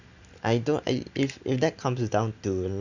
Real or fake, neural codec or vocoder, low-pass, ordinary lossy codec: real; none; 7.2 kHz; none